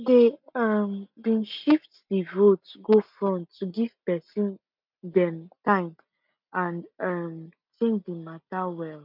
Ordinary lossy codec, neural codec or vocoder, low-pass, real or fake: MP3, 48 kbps; none; 5.4 kHz; real